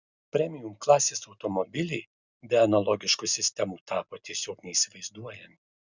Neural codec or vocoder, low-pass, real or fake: none; 7.2 kHz; real